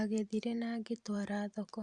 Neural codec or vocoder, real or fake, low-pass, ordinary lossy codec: vocoder, 44.1 kHz, 128 mel bands every 512 samples, BigVGAN v2; fake; 10.8 kHz; Opus, 64 kbps